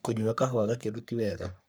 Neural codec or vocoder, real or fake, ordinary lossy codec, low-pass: codec, 44.1 kHz, 3.4 kbps, Pupu-Codec; fake; none; none